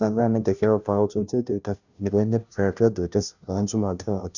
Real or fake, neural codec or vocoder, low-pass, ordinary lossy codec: fake; codec, 16 kHz, 0.5 kbps, FunCodec, trained on Chinese and English, 25 frames a second; 7.2 kHz; none